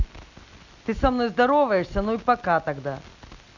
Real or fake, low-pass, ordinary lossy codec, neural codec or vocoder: real; 7.2 kHz; none; none